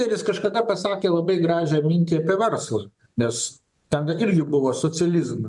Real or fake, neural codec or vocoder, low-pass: fake; vocoder, 24 kHz, 100 mel bands, Vocos; 10.8 kHz